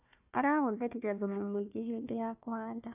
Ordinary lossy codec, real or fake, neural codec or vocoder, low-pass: AAC, 32 kbps; fake; codec, 16 kHz, 1 kbps, FunCodec, trained on Chinese and English, 50 frames a second; 3.6 kHz